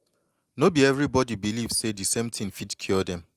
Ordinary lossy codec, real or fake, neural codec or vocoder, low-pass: Opus, 32 kbps; real; none; 14.4 kHz